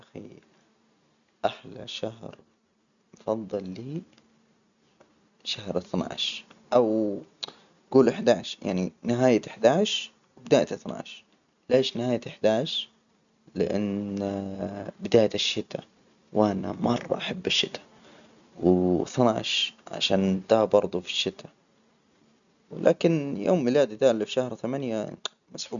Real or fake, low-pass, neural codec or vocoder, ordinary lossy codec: real; 7.2 kHz; none; none